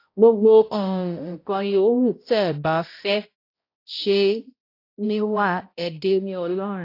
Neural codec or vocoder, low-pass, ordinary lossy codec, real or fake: codec, 16 kHz, 0.5 kbps, X-Codec, HuBERT features, trained on balanced general audio; 5.4 kHz; AAC, 32 kbps; fake